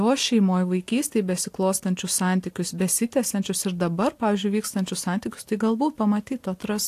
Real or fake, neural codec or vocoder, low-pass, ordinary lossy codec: real; none; 14.4 kHz; AAC, 64 kbps